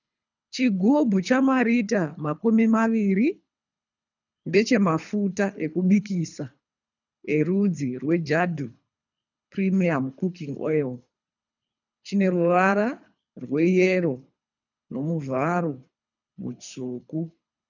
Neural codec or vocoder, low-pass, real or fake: codec, 24 kHz, 3 kbps, HILCodec; 7.2 kHz; fake